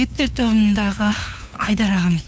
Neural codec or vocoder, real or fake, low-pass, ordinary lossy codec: codec, 16 kHz, 2 kbps, FunCodec, trained on LibriTTS, 25 frames a second; fake; none; none